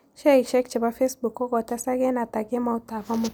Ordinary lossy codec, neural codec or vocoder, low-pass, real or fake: none; none; none; real